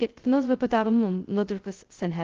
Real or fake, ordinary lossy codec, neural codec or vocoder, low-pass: fake; Opus, 16 kbps; codec, 16 kHz, 0.2 kbps, FocalCodec; 7.2 kHz